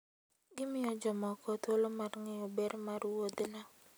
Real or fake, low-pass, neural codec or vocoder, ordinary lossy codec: real; none; none; none